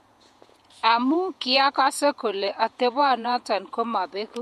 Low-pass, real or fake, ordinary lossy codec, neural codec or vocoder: 14.4 kHz; fake; MP3, 64 kbps; vocoder, 48 kHz, 128 mel bands, Vocos